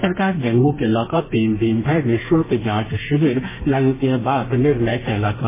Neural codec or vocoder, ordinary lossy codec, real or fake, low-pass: codec, 32 kHz, 1.9 kbps, SNAC; MP3, 16 kbps; fake; 3.6 kHz